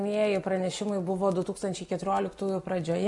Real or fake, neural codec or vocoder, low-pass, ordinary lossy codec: real; none; 10.8 kHz; Opus, 64 kbps